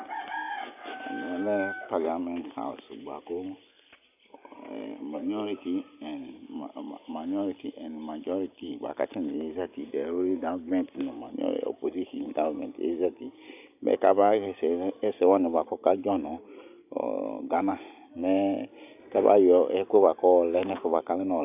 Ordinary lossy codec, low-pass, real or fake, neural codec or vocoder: AAC, 32 kbps; 3.6 kHz; real; none